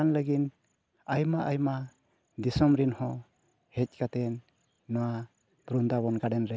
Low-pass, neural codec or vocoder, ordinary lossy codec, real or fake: none; none; none; real